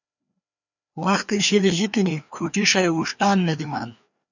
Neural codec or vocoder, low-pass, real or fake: codec, 16 kHz, 2 kbps, FreqCodec, larger model; 7.2 kHz; fake